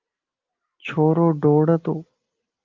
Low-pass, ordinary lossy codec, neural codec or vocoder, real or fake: 7.2 kHz; Opus, 24 kbps; none; real